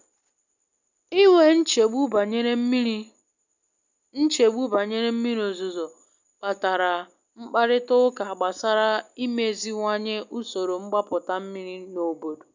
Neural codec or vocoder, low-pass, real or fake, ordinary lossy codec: none; 7.2 kHz; real; none